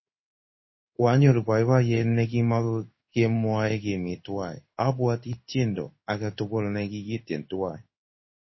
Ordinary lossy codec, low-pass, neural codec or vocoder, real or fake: MP3, 24 kbps; 7.2 kHz; codec, 16 kHz in and 24 kHz out, 1 kbps, XY-Tokenizer; fake